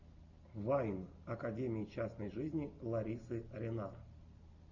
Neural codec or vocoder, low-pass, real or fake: none; 7.2 kHz; real